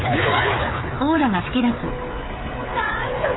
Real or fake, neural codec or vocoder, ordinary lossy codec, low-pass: fake; codec, 16 kHz, 8 kbps, FreqCodec, smaller model; AAC, 16 kbps; 7.2 kHz